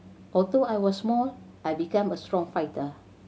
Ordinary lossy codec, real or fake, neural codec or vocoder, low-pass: none; real; none; none